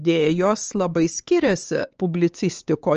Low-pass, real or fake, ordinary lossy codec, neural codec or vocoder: 7.2 kHz; fake; Opus, 24 kbps; codec, 16 kHz, 4 kbps, X-Codec, HuBERT features, trained on LibriSpeech